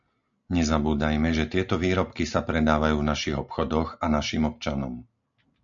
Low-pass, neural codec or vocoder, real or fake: 7.2 kHz; none; real